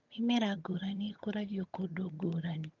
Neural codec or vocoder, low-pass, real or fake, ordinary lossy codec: vocoder, 22.05 kHz, 80 mel bands, HiFi-GAN; 7.2 kHz; fake; Opus, 32 kbps